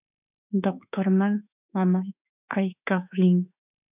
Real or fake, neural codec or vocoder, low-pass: fake; autoencoder, 48 kHz, 32 numbers a frame, DAC-VAE, trained on Japanese speech; 3.6 kHz